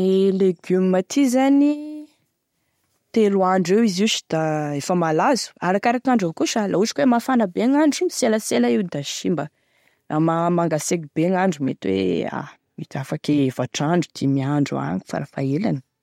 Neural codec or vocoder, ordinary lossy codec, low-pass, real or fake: none; MP3, 64 kbps; 19.8 kHz; real